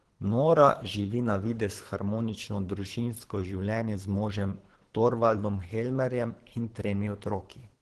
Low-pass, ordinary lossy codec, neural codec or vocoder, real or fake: 10.8 kHz; Opus, 16 kbps; codec, 24 kHz, 3 kbps, HILCodec; fake